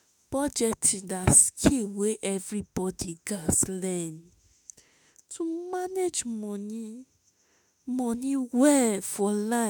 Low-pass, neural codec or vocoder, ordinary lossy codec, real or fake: none; autoencoder, 48 kHz, 32 numbers a frame, DAC-VAE, trained on Japanese speech; none; fake